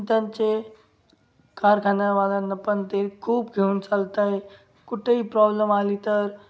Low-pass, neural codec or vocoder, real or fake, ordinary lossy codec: none; none; real; none